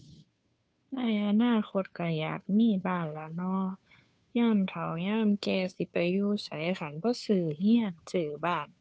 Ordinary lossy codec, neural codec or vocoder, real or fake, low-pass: none; codec, 16 kHz, 2 kbps, FunCodec, trained on Chinese and English, 25 frames a second; fake; none